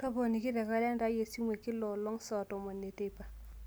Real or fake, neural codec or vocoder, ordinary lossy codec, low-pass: real; none; none; none